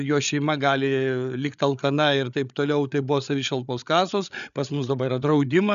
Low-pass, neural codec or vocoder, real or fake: 7.2 kHz; codec, 16 kHz, 8 kbps, FreqCodec, larger model; fake